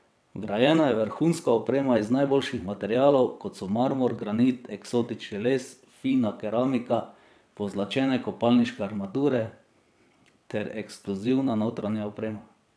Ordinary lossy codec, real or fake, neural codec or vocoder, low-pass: none; fake; vocoder, 22.05 kHz, 80 mel bands, WaveNeXt; none